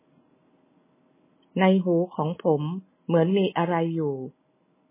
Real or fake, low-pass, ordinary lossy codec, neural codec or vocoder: real; 3.6 kHz; MP3, 16 kbps; none